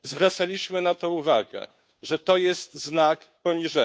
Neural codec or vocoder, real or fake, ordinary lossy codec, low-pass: codec, 16 kHz, 2 kbps, FunCodec, trained on Chinese and English, 25 frames a second; fake; none; none